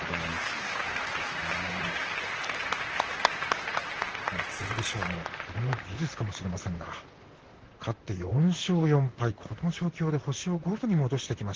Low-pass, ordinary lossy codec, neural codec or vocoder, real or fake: 7.2 kHz; Opus, 16 kbps; vocoder, 44.1 kHz, 128 mel bands, Pupu-Vocoder; fake